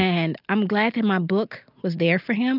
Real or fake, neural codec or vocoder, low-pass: real; none; 5.4 kHz